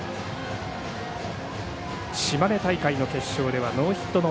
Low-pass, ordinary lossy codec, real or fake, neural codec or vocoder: none; none; real; none